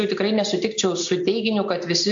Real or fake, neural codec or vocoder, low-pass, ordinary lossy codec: real; none; 7.2 kHz; AAC, 64 kbps